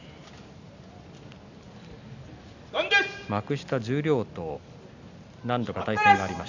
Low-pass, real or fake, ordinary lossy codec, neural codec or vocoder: 7.2 kHz; real; none; none